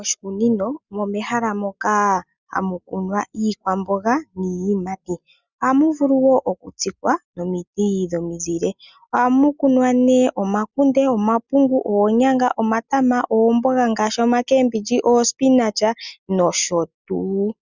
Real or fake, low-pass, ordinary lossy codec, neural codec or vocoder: real; 7.2 kHz; Opus, 64 kbps; none